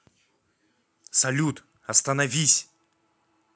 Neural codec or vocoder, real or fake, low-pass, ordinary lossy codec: none; real; none; none